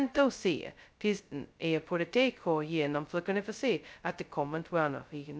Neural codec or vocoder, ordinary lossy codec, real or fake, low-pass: codec, 16 kHz, 0.2 kbps, FocalCodec; none; fake; none